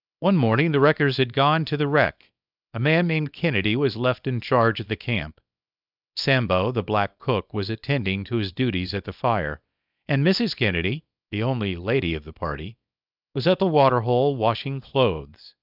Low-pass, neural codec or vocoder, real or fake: 5.4 kHz; codec, 24 kHz, 0.9 kbps, WavTokenizer, small release; fake